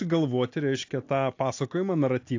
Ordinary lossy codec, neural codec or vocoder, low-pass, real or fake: AAC, 48 kbps; none; 7.2 kHz; real